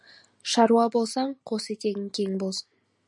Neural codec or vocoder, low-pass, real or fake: none; 10.8 kHz; real